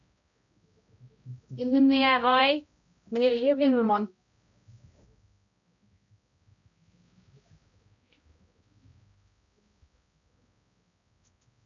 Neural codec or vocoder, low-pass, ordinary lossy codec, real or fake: codec, 16 kHz, 0.5 kbps, X-Codec, HuBERT features, trained on balanced general audio; 7.2 kHz; MP3, 64 kbps; fake